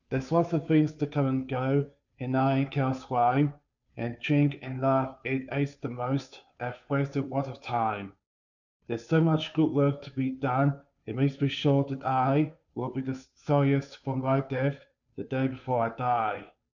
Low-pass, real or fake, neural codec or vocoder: 7.2 kHz; fake; codec, 16 kHz, 2 kbps, FunCodec, trained on Chinese and English, 25 frames a second